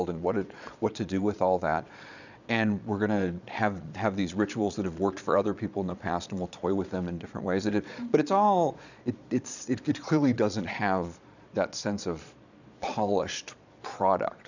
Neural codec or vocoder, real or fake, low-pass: vocoder, 22.05 kHz, 80 mel bands, Vocos; fake; 7.2 kHz